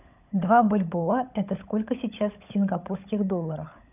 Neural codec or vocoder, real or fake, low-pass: codec, 16 kHz, 16 kbps, FunCodec, trained on LibriTTS, 50 frames a second; fake; 3.6 kHz